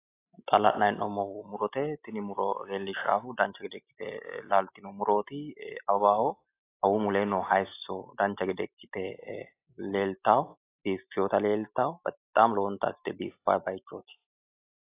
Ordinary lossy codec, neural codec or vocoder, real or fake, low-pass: AAC, 24 kbps; none; real; 3.6 kHz